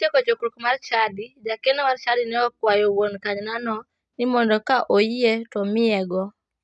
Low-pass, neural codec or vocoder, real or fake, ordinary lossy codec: 9.9 kHz; none; real; none